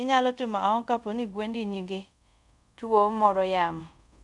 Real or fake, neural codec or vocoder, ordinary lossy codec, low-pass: fake; codec, 24 kHz, 0.5 kbps, DualCodec; none; 10.8 kHz